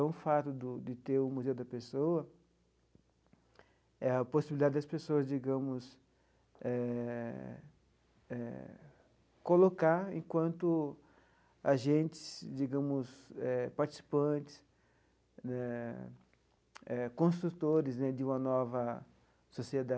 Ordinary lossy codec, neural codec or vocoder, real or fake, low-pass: none; none; real; none